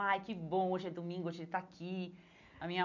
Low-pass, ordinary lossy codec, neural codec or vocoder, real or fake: 7.2 kHz; none; none; real